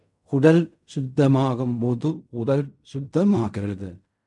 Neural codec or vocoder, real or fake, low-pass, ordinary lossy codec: codec, 16 kHz in and 24 kHz out, 0.4 kbps, LongCat-Audio-Codec, fine tuned four codebook decoder; fake; 10.8 kHz; MP3, 96 kbps